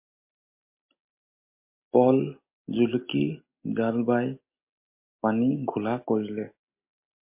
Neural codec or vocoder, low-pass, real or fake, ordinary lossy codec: none; 3.6 kHz; real; MP3, 24 kbps